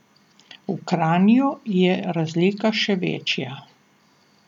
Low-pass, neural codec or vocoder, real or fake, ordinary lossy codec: 19.8 kHz; none; real; none